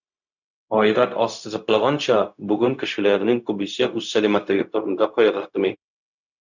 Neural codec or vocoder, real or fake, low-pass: codec, 16 kHz, 0.4 kbps, LongCat-Audio-Codec; fake; 7.2 kHz